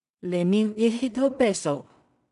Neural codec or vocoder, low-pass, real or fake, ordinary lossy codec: codec, 16 kHz in and 24 kHz out, 0.4 kbps, LongCat-Audio-Codec, two codebook decoder; 10.8 kHz; fake; none